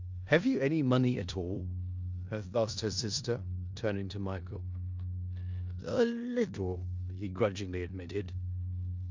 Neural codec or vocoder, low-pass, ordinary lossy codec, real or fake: codec, 16 kHz in and 24 kHz out, 0.9 kbps, LongCat-Audio-Codec, four codebook decoder; 7.2 kHz; MP3, 64 kbps; fake